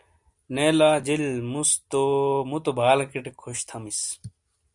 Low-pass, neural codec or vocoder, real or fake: 10.8 kHz; none; real